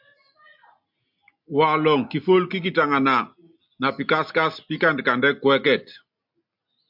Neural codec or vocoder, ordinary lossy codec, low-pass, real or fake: none; MP3, 48 kbps; 5.4 kHz; real